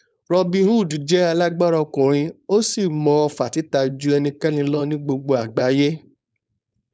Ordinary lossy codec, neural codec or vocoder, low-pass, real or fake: none; codec, 16 kHz, 4.8 kbps, FACodec; none; fake